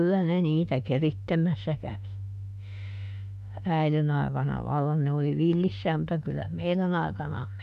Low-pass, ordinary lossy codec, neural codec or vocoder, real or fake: 14.4 kHz; none; autoencoder, 48 kHz, 32 numbers a frame, DAC-VAE, trained on Japanese speech; fake